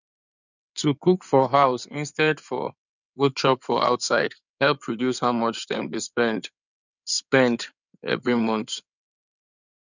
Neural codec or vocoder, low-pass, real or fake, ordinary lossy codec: codec, 16 kHz in and 24 kHz out, 2.2 kbps, FireRedTTS-2 codec; 7.2 kHz; fake; none